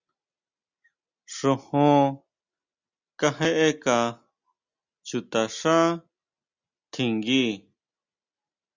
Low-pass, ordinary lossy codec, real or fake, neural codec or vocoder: 7.2 kHz; Opus, 64 kbps; real; none